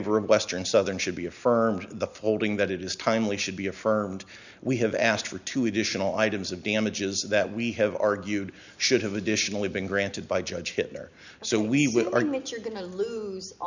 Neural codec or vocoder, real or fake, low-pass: none; real; 7.2 kHz